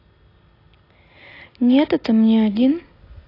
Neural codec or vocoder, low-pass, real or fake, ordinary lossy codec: none; 5.4 kHz; real; AAC, 24 kbps